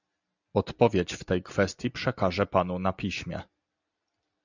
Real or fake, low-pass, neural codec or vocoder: real; 7.2 kHz; none